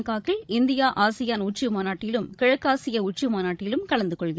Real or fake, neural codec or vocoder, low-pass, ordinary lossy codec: fake; codec, 16 kHz, 16 kbps, FreqCodec, larger model; none; none